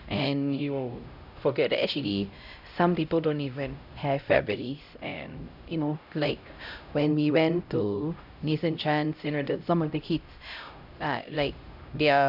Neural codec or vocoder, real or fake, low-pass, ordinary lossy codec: codec, 16 kHz, 0.5 kbps, X-Codec, HuBERT features, trained on LibriSpeech; fake; 5.4 kHz; none